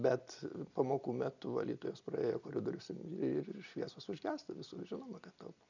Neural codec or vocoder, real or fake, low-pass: none; real; 7.2 kHz